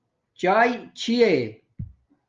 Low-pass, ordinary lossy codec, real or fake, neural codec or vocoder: 7.2 kHz; Opus, 32 kbps; real; none